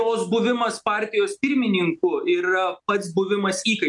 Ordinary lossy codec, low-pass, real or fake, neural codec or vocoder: MP3, 64 kbps; 10.8 kHz; real; none